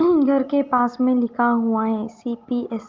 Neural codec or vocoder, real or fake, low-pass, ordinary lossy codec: none; real; 7.2 kHz; Opus, 24 kbps